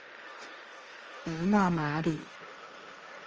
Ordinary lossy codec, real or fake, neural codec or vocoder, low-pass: Opus, 24 kbps; fake; codec, 16 kHz, 1.1 kbps, Voila-Tokenizer; 7.2 kHz